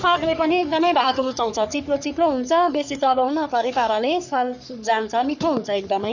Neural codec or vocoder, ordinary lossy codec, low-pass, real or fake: codec, 44.1 kHz, 3.4 kbps, Pupu-Codec; none; 7.2 kHz; fake